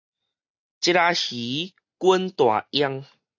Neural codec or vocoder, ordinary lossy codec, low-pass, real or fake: none; AAC, 48 kbps; 7.2 kHz; real